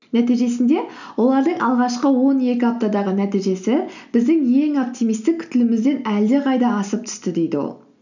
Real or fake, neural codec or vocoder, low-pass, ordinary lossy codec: real; none; 7.2 kHz; none